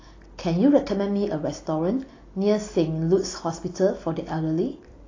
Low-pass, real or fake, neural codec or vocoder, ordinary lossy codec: 7.2 kHz; real; none; AAC, 32 kbps